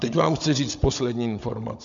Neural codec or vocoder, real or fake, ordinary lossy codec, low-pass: codec, 16 kHz, 16 kbps, FunCodec, trained on Chinese and English, 50 frames a second; fake; MP3, 64 kbps; 7.2 kHz